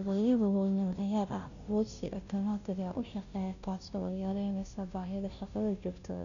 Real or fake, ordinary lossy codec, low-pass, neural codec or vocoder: fake; none; 7.2 kHz; codec, 16 kHz, 0.5 kbps, FunCodec, trained on Chinese and English, 25 frames a second